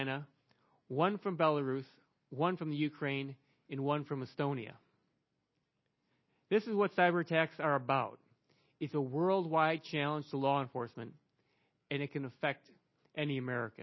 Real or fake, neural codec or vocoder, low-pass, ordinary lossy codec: real; none; 7.2 kHz; MP3, 24 kbps